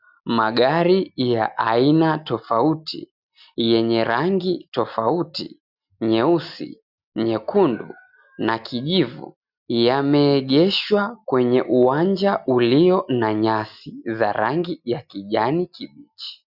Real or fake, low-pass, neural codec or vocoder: real; 5.4 kHz; none